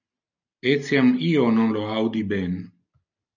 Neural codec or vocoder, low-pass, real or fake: none; 7.2 kHz; real